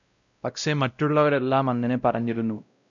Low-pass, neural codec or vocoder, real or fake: 7.2 kHz; codec, 16 kHz, 0.5 kbps, X-Codec, WavLM features, trained on Multilingual LibriSpeech; fake